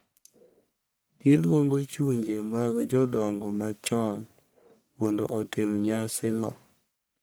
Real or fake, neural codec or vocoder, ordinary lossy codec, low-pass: fake; codec, 44.1 kHz, 1.7 kbps, Pupu-Codec; none; none